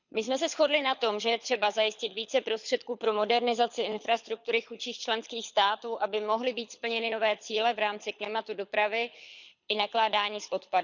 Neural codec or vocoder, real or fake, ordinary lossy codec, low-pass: codec, 24 kHz, 6 kbps, HILCodec; fake; none; 7.2 kHz